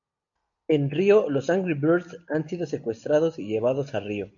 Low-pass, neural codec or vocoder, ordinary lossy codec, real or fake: 7.2 kHz; none; MP3, 96 kbps; real